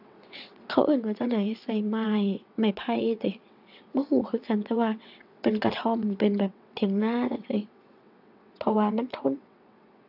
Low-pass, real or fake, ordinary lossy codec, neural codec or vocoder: 5.4 kHz; fake; AAC, 48 kbps; vocoder, 22.05 kHz, 80 mel bands, WaveNeXt